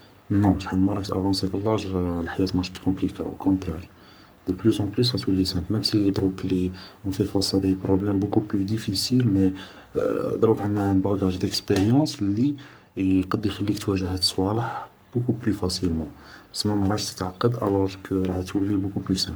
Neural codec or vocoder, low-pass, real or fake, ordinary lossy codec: codec, 44.1 kHz, 3.4 kbps, Pupu-Codec; none; fake; none